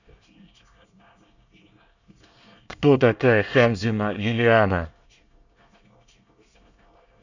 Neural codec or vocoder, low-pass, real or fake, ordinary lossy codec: codec, 24 kHz, 1 kbps, SNAC; 7.2 kHz; fake; none